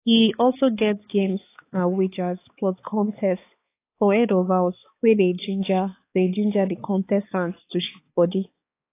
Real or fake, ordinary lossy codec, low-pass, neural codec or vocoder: fake; AAC, 24 kbps; 3.6 kHz; codec, 16 kHz, 4 kbps, X-Codec, HuBERT features, trained on balanced general audio